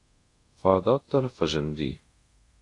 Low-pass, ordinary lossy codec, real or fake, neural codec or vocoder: 10.8 kHz; AAC, 48 kbps; fake; codec, 24 kHz, 0.5 kbps, DualCodec